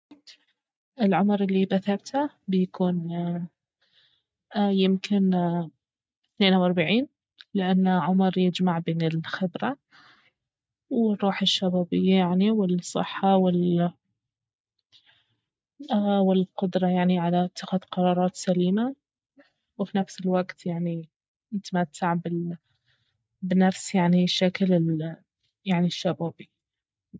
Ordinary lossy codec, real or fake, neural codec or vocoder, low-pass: none; real; none; none